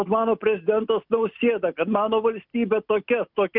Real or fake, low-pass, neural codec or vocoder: real; 5.4 kHz; none